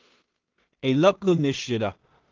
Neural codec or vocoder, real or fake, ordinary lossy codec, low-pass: codec, 16 kHz in and 24 kHz out, 0.4 kbps, LongCat-Audio-Codec, two codebook decoder; fake; Opus, 24 kbps; 7.2 kHz